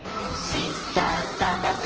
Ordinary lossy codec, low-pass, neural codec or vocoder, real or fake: Opus, 16 kbps; 7.2 kHz; codec, 16 kHz in and 24 kHz out, 1.1 kbps, FireRedTTS-2 codec; fake